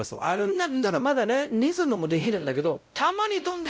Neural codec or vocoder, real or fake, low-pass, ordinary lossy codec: codec, 16 kHz, 0.5 kbps, X-Codec, WavLM features, trained on Multilingual LibriSpeech; fake; none; none